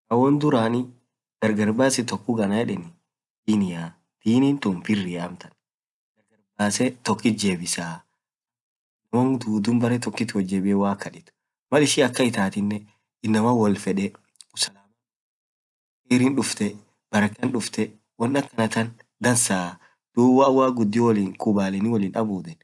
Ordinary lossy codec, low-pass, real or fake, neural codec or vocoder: none; none; real; none